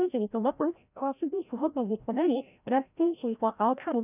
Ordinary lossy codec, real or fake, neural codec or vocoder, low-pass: none; fake; codec, 16 kHz, 0.5 kbps, FreqCodec, larger model; 3.6 kHz